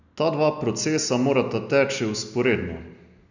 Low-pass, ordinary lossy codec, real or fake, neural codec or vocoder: 7.2 kHz; none; real; none